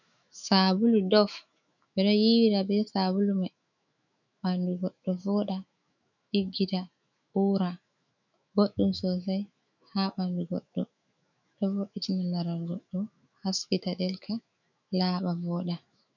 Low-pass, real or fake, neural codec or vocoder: 7.2 kHz; fake; autoencoder, 48 kHz, 128 numbers a frame, DAC-VAE, trained on Japanese speech